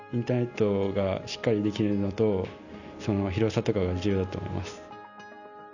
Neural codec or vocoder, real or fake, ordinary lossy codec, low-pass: none; real; none; 7.2 kHz